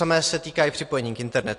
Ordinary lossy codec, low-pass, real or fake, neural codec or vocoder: AAC, 48 kbps; 10.8 kHz; real; none